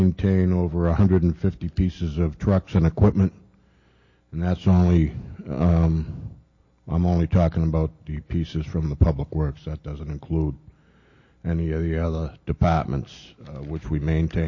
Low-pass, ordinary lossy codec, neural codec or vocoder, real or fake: 7.2 kHz; MP3, 32 kbps; none; real